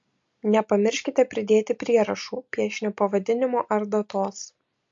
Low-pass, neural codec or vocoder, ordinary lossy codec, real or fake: 7.2 kHz; none; MP3, 48 kbps; real